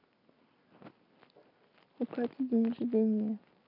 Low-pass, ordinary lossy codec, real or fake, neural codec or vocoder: 5.4 kHz; none; real; none